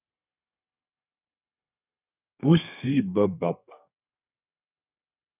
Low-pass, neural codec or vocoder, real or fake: 3.6 kHz; codec, 32 kHz, 1.9 kbps, SNAC; fake